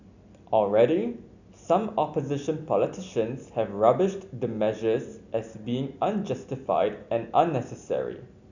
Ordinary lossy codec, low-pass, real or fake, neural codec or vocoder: none; 7.2 kHz; real; none